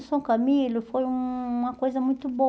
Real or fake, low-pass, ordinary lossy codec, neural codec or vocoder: real; none; none; none